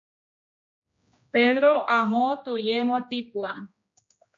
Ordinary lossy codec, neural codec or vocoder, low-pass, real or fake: MP3, 48 kbps; codec, 16 kHz, 1 kbps, X-Codec, HuBERT features, trained on general audio; 7.2 kHz; fake